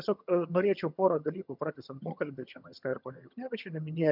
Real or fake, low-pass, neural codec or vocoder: fake; 5.4 kHz; vocoder, 22.05 kHz, 80 mel bands, HiFi-GAN